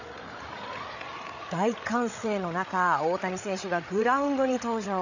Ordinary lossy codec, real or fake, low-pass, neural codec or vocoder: none; fake; 7.2 kHz; codec, 16 kHz, 8 kbps, FreqCodec, larger model